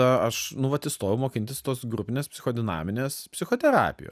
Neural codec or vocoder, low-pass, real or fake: none; 14.4 kHz; real